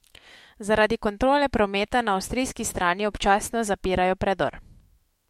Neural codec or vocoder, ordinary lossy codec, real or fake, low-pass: autoencoder, 48 kHz, 128 numbers a frame, DAC-VAE, trained on Japanese speech; MP3, 64 kbps; fake; 19.8 kHz